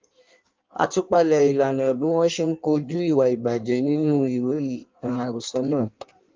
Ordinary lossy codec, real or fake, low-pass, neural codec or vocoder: Opus, 24 kbps; fake; 7.2 kHz; codec, 16 kHz in and 24 kHz out, 1.1 kbps, FireRedTTS-2 codec